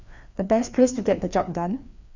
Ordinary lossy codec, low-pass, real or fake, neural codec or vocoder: none; 7.2 kHz; fake; codec, 16 kHz, 2 kbps, FreqCodec, larger model